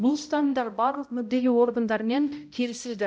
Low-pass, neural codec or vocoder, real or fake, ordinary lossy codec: none; codec, 16 kHz, 0.5 kbps, X-Codec, HuBERT features, trained on balanced general audio; fake; none